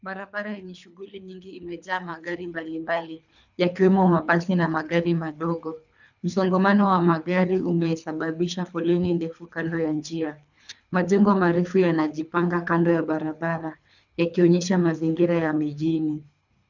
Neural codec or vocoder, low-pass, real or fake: codec, 24 kHz, 3 kbps, HILCodec; 7.2 kHz; fake